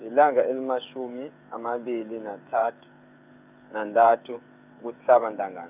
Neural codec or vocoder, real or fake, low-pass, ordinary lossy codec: codec, 16 kHz, 8 kbps, FreqCodec, smaller model; fake; 3.6 kHz; none